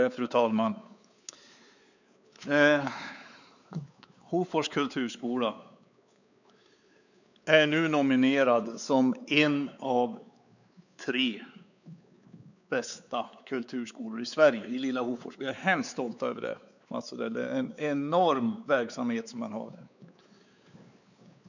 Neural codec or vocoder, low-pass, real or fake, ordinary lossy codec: codec, 16 kHz, 4 kbps, X-Codec, WavLM features, trained on Multilingual LibriSpeech; 7.2 kHz; fake; none